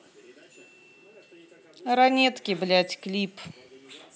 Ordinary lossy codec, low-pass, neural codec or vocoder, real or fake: none; none; none; real